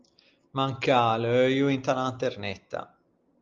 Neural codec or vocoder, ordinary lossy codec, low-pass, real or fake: none; Opus, 24 kbps; 7.2 kHz; real